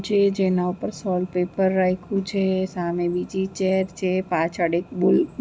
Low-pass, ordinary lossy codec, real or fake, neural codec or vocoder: none; none; real; none